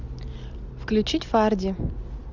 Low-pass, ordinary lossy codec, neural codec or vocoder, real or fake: 7.2 kHz; Opus, 64 kbps; none; real